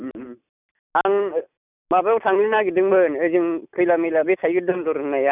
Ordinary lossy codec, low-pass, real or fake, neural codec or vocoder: none; 3.6 kHz; real; none